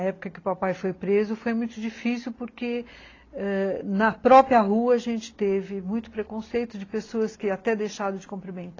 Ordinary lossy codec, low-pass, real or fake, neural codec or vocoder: AAC, 32 kbps; 7.2 kHz; real; none